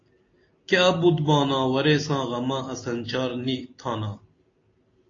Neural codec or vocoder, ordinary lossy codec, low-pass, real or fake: none; AAC, 32 kbps; 7.2 kHz; real